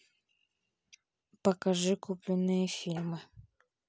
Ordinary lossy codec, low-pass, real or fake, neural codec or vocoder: none; none; real; none